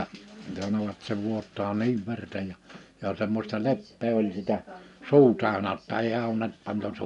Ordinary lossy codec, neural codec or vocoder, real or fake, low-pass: none; none; real; 10.8 kHz